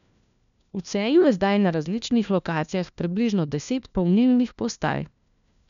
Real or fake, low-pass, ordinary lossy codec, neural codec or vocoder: fake; 7.2 kHz; MP3, 96 kbps; codec, 16 kHz, 1 kbps, FunCodec, trained on LibriTTS, 50 frames a second